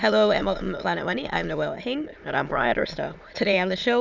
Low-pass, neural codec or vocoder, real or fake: 7.2 kHz; autoencoder, 22.05 kHz, a latent of 192 numbers a frame, VITS, trained on many speakers; fake